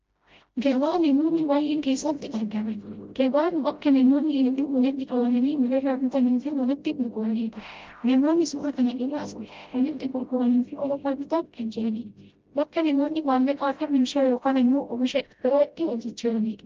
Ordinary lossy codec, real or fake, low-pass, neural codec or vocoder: Opus, 24 kbps; fake; 7.2 kHz; codec, 16 kHz, 0.5 kbps, FreqCodec, smaller model